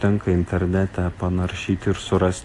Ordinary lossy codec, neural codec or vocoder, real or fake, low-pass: AAC, 48 kbps; none; real; 10.8 kHz